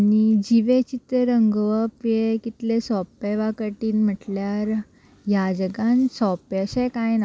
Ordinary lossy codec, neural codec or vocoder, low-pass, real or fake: none; none; none; real